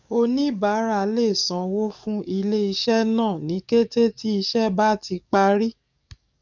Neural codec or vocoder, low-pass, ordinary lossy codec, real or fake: codec, 44.1 kHz, 7.8 kbps, DAC; 7.2 kHz; none; fake